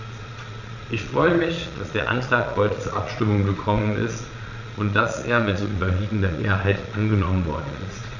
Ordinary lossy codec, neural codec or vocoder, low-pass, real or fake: none; vocoder, 22.05 kHz, 80 mel bands, Vocos; 7.2 kHz; fake